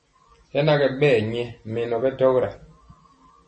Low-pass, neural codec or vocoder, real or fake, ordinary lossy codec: 10.8 kHz; none; real; MP3, 32 kbps